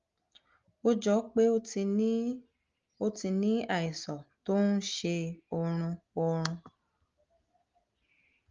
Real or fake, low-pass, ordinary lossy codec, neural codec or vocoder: real; 7.2 kHz; Opus, 32 kbps; none